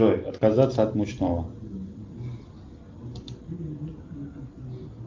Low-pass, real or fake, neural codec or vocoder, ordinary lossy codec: 7.2 kHz; real; none; Opus, 32 kbps